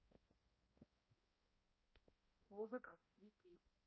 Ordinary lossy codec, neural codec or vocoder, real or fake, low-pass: MP3, 24 kbps; codec, 16 kHz, 0.5 kbps, X-Codec, HuBERT features, trained on balanced general audio; fake; 7.2 kHz